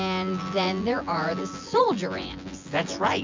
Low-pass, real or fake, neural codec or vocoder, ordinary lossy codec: 7.2 kHz; fake; vocoder, 24 kHz, 100 mel bands, Vocos; MP3, 64 kbps